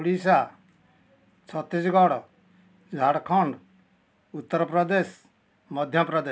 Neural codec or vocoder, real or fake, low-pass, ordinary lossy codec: none; real; none; none